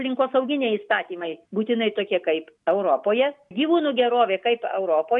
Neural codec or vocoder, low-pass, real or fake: none; 10.8 kHz; real